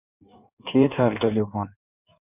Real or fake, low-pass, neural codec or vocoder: fake; 3.6 kHz; codec, 16 kHz in and 24 kHz out, 1.1 kbps, FireRedTTS-2 codec